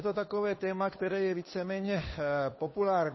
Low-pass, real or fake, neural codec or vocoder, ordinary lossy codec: 7.2 kHz; fake; codec, 16 kHz, 2 kbps, FunCodec, trained on Chinese and English, 25 frames a second; MP3, 24 kbps